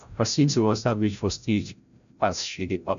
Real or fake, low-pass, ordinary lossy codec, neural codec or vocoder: fake; 7.2 kHz; none; codec, 16 kHz, 0.5 kbps, FreqCodec, larger model